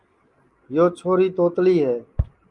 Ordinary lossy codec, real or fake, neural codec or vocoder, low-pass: Opus, 32 kbps; real; none; 10.8 kHz